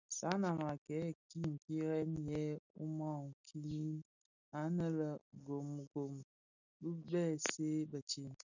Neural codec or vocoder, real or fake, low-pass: none; real; 7.2 kHz